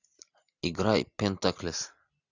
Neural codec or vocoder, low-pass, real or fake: none; 7.2 kHz; real